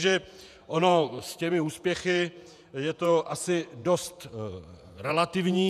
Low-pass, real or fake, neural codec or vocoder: 14.4 kHz; fake; vocoder, 48 kHz, 128 mel bands, Vocos